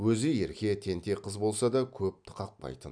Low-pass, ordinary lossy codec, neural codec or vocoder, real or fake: 9.9 kHz; none; none; real